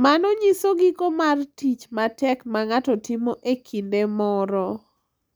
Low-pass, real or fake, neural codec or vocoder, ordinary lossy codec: none; real; none; none